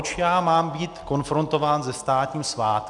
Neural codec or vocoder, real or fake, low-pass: none; real; 10.8 kHz